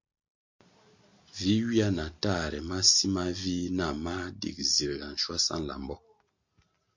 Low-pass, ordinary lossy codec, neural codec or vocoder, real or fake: 7.2 kHz; MP3, 48 kbps; none; real